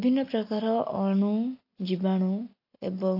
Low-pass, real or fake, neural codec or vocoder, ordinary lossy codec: 5.4 kHz; real; none; AAC, 32 kbps